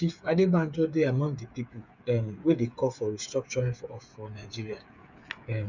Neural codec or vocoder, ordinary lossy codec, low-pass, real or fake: codec, 16 kHz, 8 kbps, FreqCodec, smaller model; none; 7.2 kHz; fake